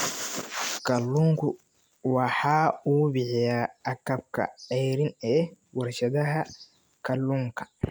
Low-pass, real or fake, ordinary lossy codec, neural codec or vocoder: none; real; none; none